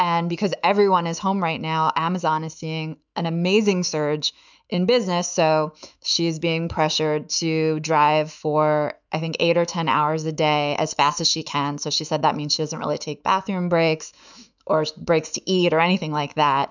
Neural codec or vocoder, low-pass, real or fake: autoencoder, 48 kHz, 128 numbers a frame, DAC-VAE, trained on Japanese speech; 7.2 kHz; fake